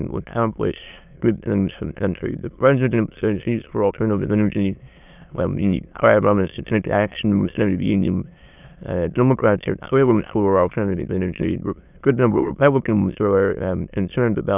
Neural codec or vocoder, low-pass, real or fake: autoencoder, 22.05 kHz, a latent of 192 numbers a frame, VITS, trained on many speakers; 3.6 kHz; fake